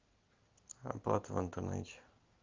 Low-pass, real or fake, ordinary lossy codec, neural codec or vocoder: 7.2 kHz; real; Opus, 32 kbps; none